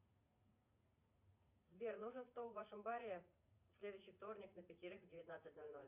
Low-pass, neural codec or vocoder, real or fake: 3.6 kHz; vocoder, 44.1 kHz, 80 mel bands, Vocos; fake